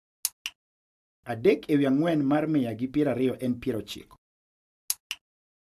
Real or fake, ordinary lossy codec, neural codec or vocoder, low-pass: real; AAC, 96 kbps; none; 14.4 kHz